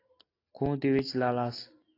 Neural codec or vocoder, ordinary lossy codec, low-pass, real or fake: none; AAC, 32 kbps; 5.4 kHz; real